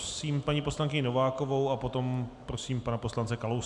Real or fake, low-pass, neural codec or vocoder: real; 10.8 kHz; none